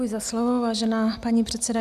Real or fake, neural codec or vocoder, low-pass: real; none; 14.4 kHz